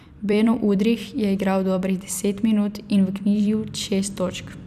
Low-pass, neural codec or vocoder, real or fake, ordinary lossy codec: 14.4 kHz; vocoder, 44.1 kHz, 128 mel bands every 256 samples, BigVGAN v2; fake; none